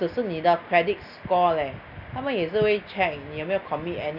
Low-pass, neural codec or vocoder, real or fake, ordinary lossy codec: 5.4 kHz; none; real; Opus, 64 kbps